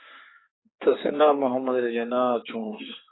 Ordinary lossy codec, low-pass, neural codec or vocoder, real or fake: AAC, 16 kbps; 7.2 kHz; codec, 16 kHz, 4 kbps, X-Codec, HuBERT features, trained on general audio; fake